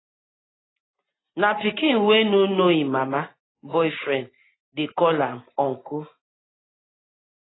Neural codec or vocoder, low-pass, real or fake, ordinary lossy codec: none; 7.2 kHz; real; AAC, 16 kbps